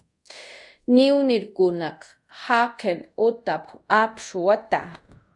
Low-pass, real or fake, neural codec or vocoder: 10.8 kHz; fake; codec, 24 kHz, 0.5 kbps, DualCodec